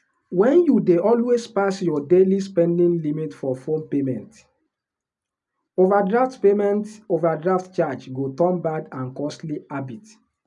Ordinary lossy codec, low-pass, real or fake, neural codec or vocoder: none; 10.8 kHz; real; none